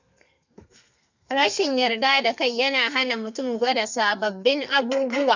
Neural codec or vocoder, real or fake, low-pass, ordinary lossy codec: codec, 32 kHz, 1.9 kbps, SNAC; fake; 7.2 kHz; none